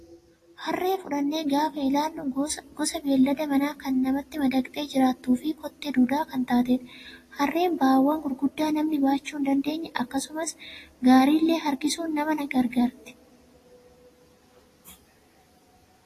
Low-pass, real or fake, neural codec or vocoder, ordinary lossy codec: 14.4 kHz; real; none; AAC, 48 kbps